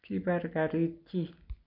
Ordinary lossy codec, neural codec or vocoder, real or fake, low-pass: none; none; real; 5.4 kHz